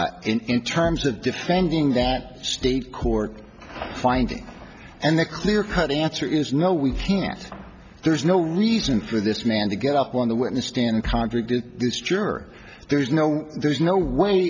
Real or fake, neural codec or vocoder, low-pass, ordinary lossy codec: real; none; 7.2 kHz; MP3, 64 kbps